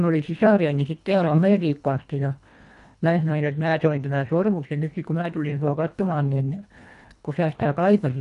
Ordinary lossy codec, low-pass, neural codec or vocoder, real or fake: none; 10.8 kHz; codec, 24 kHz, 1.5 kbps, HILCodec; fake